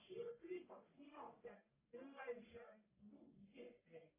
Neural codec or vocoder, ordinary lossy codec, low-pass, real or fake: codec, 44.1 kHz, 1.7 kbps, Pupu-Codec; AAC, 24 kbps; 3.6 kHz; fake